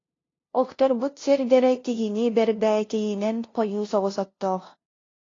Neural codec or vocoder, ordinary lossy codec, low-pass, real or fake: codec, 16 kHz, 0.5 kbps, FunCodec, trained on LibriTTS, 25 frames a second; AAC, 32 kbps; 7.2 kHz; fake